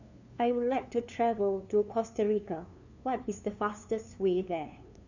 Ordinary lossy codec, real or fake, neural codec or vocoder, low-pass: none; fake; codec, 16 kHz, 2 kbps, FunCodec, trained on LibriTTS, 25 frames a second; 7.2 kHz